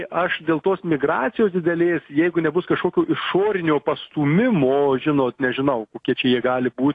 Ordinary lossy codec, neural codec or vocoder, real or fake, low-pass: AAC, 48 kbps; none; real; 10.8 kHz